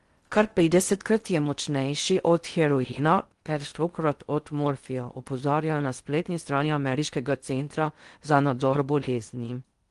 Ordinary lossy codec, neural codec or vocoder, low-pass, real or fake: Opus, 24 kbps; codec, 16 kHz in and 24 kHz out, 0.6 kbps, FocalCodec, streaming, 4096 codes; 10.8 kHz; fake